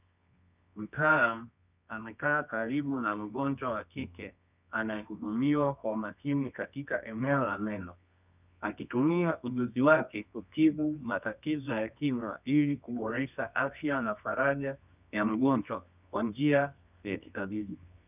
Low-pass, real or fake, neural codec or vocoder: 3.6 kHz; fake; codec, 24 kHz, 0.9 kbps, WavTokenizer, medium music audio release